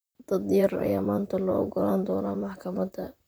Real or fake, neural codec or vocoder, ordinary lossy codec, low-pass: fake; vocoder, 44.1 kHz, 128 mel bands, Pupu-Vocoder; none; none